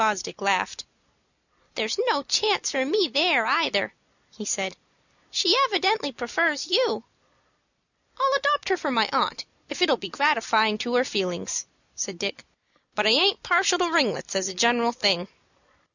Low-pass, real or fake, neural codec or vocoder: 7.2 kHz; real; none